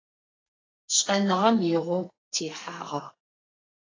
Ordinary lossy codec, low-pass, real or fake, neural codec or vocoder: AAC, 32 kbps; 7.2 kHz; fake; codec, 32 kHz, 1.9 kbps, SNAC